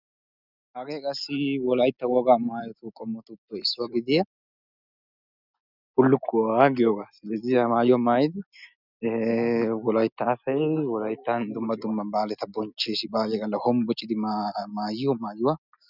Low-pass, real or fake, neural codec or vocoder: 5.4 kHz; real; none